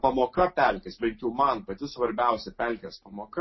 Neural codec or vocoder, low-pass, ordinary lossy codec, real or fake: none; 7.2 kHz; MP3, 24 kbps; real